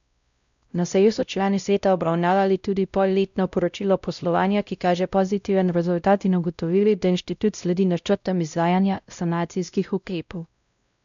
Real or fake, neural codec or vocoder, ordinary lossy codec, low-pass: fake; codec, 16 kHz, 0.5 kbps, X-Codec, WavLM features, trained on Multilingual LibriSpeech; none; 7.2 kHz